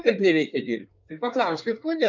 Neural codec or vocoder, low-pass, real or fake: codec, 24 kHz, 1 kbps, SNAC; 7.2 kHz; fake